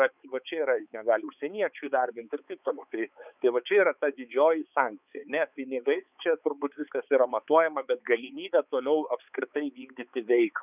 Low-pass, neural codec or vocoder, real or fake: 3.6 kHz; codec, 16 kHz, 4 kbps, X-Codec, HuBERT features, trained on balanced general audio; fake